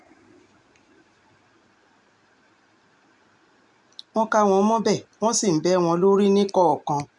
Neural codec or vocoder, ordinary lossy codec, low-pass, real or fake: none; none; 10.8 kHz; real